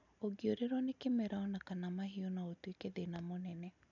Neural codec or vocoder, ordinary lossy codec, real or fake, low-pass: none; none; real; 7.2 kHz